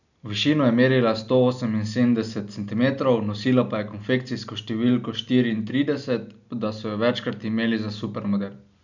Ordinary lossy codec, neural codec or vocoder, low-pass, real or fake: none; none; 7.2 kHz; real